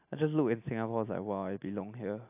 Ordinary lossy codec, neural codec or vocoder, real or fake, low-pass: AAC, 32 kbps; none; real; 3.6 kHz